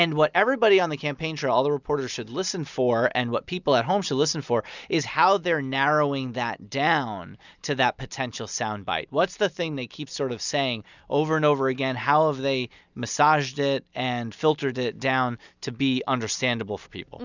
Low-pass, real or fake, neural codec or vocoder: 7.2 kHz; real; none